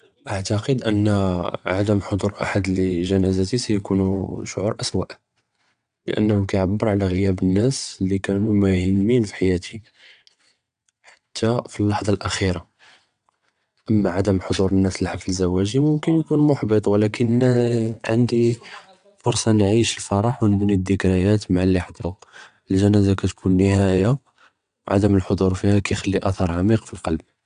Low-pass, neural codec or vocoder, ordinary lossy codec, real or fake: 9.9 kHz; vocoder, 22.05 kHz, 80 mel bands, WaveNeXt; MP3, 96 kbps; fake